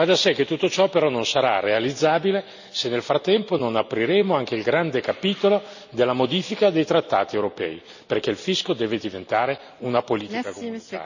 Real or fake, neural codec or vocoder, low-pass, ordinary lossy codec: real; none; 7.2 kHz; none